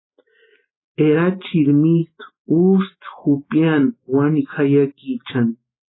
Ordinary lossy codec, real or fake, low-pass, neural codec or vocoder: AAC, 16 kbps; real; 7.2 kHz; none